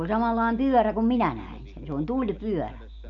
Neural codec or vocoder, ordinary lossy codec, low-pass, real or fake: none; none; 7.2 kHz; real